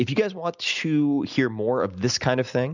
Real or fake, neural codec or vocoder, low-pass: real; none; 7.2 kHz